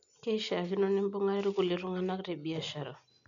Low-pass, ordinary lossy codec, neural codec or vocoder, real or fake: 7.2 kHz; none; none; real